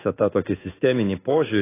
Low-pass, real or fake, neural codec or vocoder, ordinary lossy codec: 3.6 kHz; fake; codec, 16 kHz in and 24 kHz out, 1 kbps, XY-Tokenizer; AAC, 16 kbps